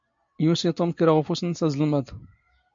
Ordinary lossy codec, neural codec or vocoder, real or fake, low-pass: MP3, 48 kbps; none; real; 7.2 kHz